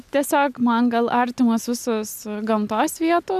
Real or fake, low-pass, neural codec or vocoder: real; 14.4 kHz; none